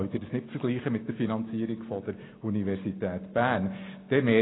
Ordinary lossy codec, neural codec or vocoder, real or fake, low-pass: AAC, 16 kbps; none; real; 7.2 kHz